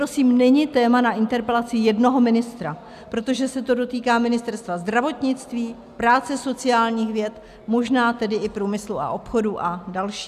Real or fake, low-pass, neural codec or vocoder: real; 14.4 kHz; none